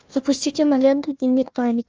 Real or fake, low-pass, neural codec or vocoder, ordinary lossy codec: fake; 7.2 kHz; codec, 16 kHz, 1 kbps, FunCodec, trained on Chinese and English, 50 frames a second; Opus, 24 kbps